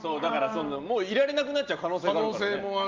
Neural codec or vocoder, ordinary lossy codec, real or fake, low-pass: none; Opus, 32 kbps; real; 7.2 kHz